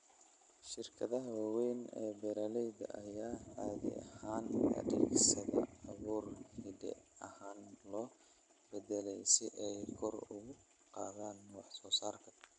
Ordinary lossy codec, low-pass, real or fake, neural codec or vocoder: none; 9.9 kHz; real; none